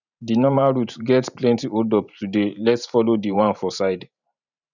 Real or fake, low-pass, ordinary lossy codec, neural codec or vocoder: fake; 7.2 kHz; none; vocoder, 44.1 kHz, 128 mel bands every 512 samples, BigVGAN v2